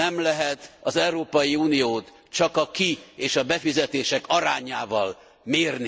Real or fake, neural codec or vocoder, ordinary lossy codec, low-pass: real; none; none; none